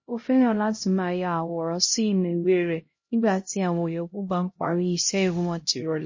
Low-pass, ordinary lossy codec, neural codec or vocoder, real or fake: 7.2 kHz; MP3, 32 kbps; codec, 16 kHz, 0.5 kbps, X-Codec, HuBERT features, trained on LibriSpeech; fake